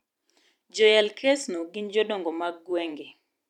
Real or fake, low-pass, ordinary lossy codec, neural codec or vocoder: fake; 19.8 kHz; none; vocoder, 44.1 kHz, 128 mel bands every 512 samples, BigVGAN v2